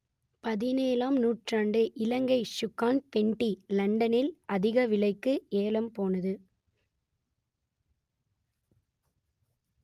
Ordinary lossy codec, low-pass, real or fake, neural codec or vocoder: Opus, 32 kbps; 14.4 kHz; real; none